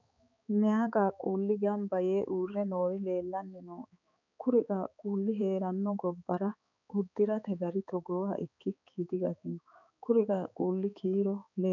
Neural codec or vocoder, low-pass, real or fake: codec, 16 kHz, 4 kbps, X-Codec, HuBERT features, trained on balanced general audio; 7.2 kHz; fake